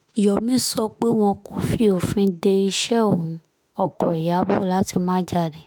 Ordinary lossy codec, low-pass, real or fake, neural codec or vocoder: none; none; fake; autoencoder, 48 kHz, 32 numbers a frame, DAC-VAE, trained on Japanese speech